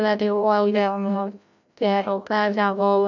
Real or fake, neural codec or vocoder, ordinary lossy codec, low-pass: fake; codec, 16 kHz, 0.5 kbps, FreqCodec, larger model; none; 7.2 kHz